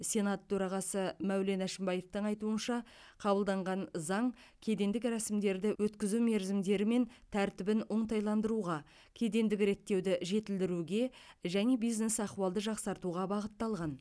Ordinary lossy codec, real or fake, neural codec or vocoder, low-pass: none; real; none; none